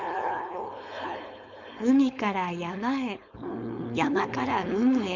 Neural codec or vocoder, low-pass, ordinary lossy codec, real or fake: codec, 16 kHz, 4.8 kbps, FACodec; 7.2 kHz; none; fake